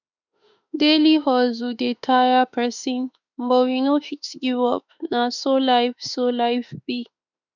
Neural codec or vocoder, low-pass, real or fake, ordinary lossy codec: autoencoder, 48 kHz, 32 numbers a frame, DAC-VAE, trained on Japanese speech; 7.2 kHz; fake; none